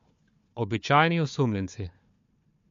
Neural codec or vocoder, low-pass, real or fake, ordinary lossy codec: codec, 16 kHz, 4 kbps, FunCodec, trained on Chinese and English, 50 frames a second; 7.2 kHz; fake; MP3, 48 kbps